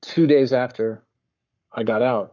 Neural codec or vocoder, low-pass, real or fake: codec, 44.1 kHz, 7.8 kbps, Pupu-Codec; 7.2 kHz; fake